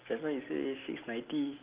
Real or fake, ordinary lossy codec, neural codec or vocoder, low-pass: real; Opus, 32 kbps; none; 3.6 kHz